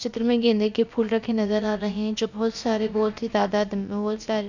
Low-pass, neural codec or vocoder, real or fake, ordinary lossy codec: 7.2 kHz; codec, 16 kHz, about 1 kbps, DyCAST, with the encoder's durations; fake; none